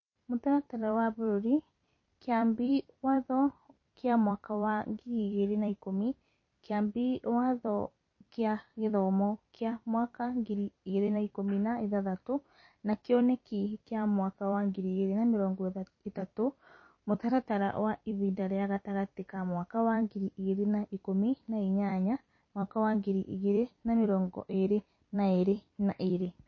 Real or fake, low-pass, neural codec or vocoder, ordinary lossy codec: fake; 7.2 kHz; vocoder, 44.1 kHz, 128 mel bands every 256 samples, BigVGAN v2; MP3, 32 kbps